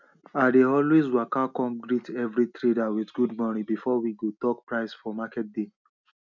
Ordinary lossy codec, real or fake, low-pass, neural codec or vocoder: none; real; 7.2 kHz; none